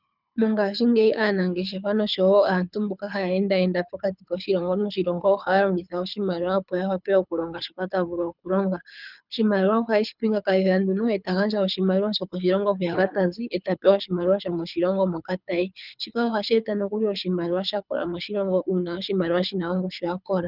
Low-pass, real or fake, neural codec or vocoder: 5.4 kHz; fake; codec, 24 kHz, 6 kbps, HILCodec